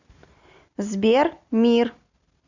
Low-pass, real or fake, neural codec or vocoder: 7.2 kHz; real; none